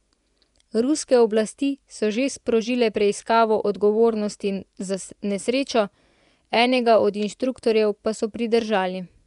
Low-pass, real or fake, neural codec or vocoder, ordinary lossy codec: 10.8 kHz; fake; vocoder, 24 kHz, 100 mel bands, Vocos; none